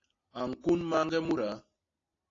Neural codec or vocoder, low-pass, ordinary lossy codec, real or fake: none; 7.2 kHz; AAC, 32 kbps; real